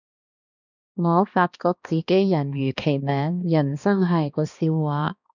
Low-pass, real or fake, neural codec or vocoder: 7.2 kHz; fake; codec, 16 kHz, 2 kbps, X-Codec, HuBERT features, trained on balanced general audio